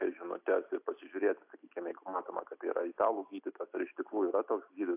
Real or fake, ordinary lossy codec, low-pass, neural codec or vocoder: real; AAC, 32 kbps; 3.6 kHz; none